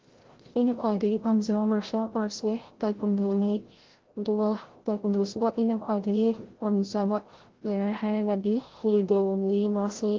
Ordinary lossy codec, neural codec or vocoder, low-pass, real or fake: Opus, 16 kbps; codec, 16 kHz, 0.5 kbps, FreqCodec, larger model; 7.2 kHz; fake